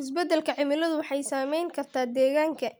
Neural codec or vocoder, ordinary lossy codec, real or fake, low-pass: none; none; real; none